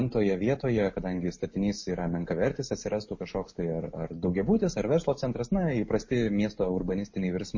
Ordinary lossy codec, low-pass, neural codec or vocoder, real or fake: MP3, 32 kbps; 7.2 kHz; none; real